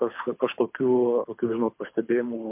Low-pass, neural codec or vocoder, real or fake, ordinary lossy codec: 3.6 kHz; codec, 24 kHz, 6 kbps, HILCodec; fake; MP3, 32 kbps